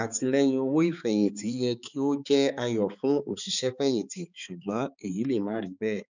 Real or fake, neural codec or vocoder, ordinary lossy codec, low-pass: fake; codec, 16 kHz, 4 kbps, X-Codec, HuBERT features, trained on balanced general audio; none; 7.2 kHz